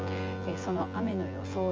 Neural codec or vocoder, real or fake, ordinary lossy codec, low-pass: vocoder, 24 kHz, 100 mel bands, Vocos; fake; Opus, 24 kbps; 7.2 kHz